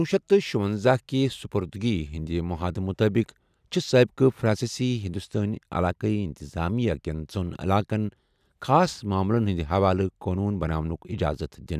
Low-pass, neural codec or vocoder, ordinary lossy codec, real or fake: 14.4 kHz; none; none; real